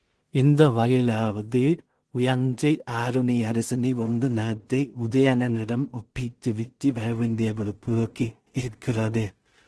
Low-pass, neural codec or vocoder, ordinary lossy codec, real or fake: 10.8 kHz; codec, 16 kHz in and 24 kHz out, 0.4 kbps, LongCat-Audio-Codec, two codebook decoder; Opus, 16 kbps; fake